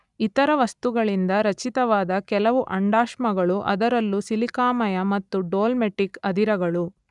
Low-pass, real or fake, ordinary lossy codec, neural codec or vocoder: 10.8 kHz; real; none; none